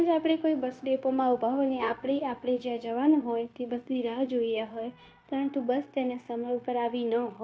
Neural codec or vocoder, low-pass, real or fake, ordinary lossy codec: codec, 16 kHz, 0.9 kbps, LongCat-Audio-Codec; none; fake; none